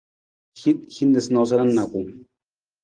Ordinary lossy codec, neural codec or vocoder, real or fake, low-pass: Opus, 24 kbps; none; real; 9.9 kHz